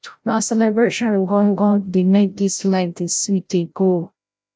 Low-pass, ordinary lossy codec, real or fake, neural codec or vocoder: none; none; fake; codec, 16 kHz, 0.5 kbps, FreqCodec, larger model